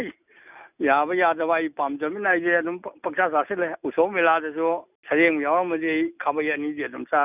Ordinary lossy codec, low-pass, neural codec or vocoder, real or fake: none; 3.6 kHz; none; real